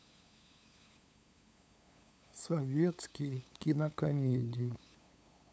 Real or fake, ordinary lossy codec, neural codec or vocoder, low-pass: fake; none; codec, 16 kHz, 8 kbps, FunCodec, trained on LibriTTS, 25 frames a second; none